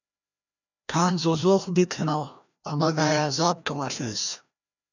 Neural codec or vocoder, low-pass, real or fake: codec, 16 kHz, 1 kbps, FreqCodec, larger model; 7.2 kHz; fake